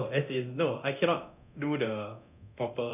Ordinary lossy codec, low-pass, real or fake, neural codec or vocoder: none; 3.6 kHz; fake; codec, 24 kHz, 0.9 kbps, DualCodec